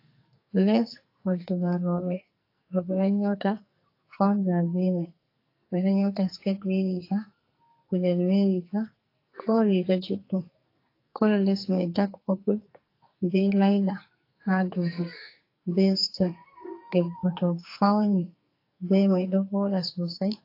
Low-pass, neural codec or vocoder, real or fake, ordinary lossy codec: 5.4 kHz; codec, 44.1 kHz, 2.6 kbps, SNAC; fake; AAC, 32 kbps